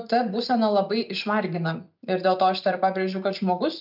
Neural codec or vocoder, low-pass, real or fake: none; 5.4 kHz; real